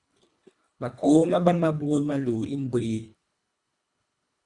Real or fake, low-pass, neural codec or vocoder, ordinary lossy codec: fake; 10.8 kHz; codec, 24 kHz, 1.5 kbps, HILCodec; Opus, 64 kbps